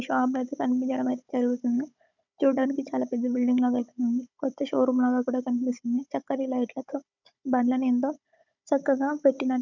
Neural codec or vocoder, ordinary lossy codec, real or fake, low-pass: codec, 16 kHz, 16 kbps, FunCodec, trained on Chinese and English, 50 frames a second; none; fake; 7.2 kHz